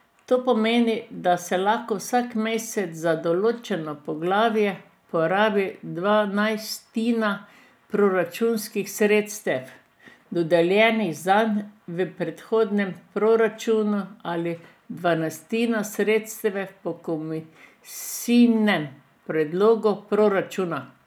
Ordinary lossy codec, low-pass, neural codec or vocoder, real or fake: none; none; none; real